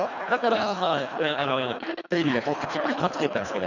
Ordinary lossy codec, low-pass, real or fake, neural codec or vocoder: none; 7.2 kHz; fake; codec, 24 kHz, 1.5 kbps, HILCodec